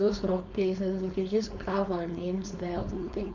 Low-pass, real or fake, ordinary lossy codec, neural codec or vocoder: 7.2 kHz; fake; none; codec, 16 kHz, 4.8 kbps, FACodec